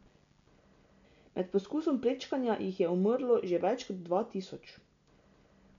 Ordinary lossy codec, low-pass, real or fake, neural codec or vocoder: MP3, 64 kbps; 7.2 kHz; real; none